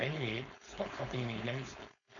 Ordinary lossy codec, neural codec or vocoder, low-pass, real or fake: none; codec, 16 kHz, 4.8 kbps, FACodec; 7.2 kHz; fake